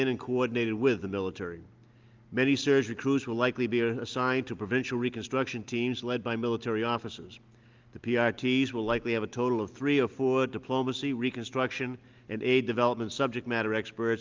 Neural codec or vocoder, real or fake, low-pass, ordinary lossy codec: none; real; 7.2 kHz; Opus, 32 kbps